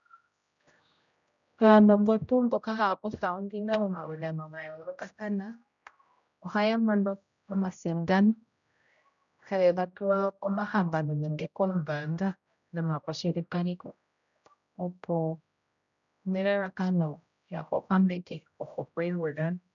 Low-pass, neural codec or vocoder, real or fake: 7.2 kHz; codec, 16 kHz, 0.5 kbps, X-Codec, HuBERT features, trained on general audio; fake